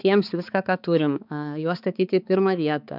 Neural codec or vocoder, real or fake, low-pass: codec, 16 kHz, 4 kbps, X-Codec, HuBERT features, trained on balanced general audio; fake; 5.4 kHz